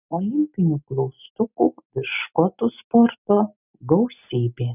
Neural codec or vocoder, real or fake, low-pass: none; real; 3.6 kHz